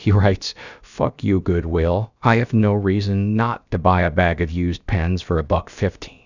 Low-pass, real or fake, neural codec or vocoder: 7.2 kHz; fake; codec, 16 kHz, about 1 kbps, DyCAST, with the encoder's durations